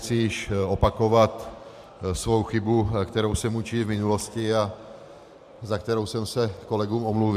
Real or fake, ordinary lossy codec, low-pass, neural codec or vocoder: fake; MP3, 96 kbps; 14.4 kHz; vocoder, 44.1 kHz, 128 mel bands every 512 samples, BigVGAN v2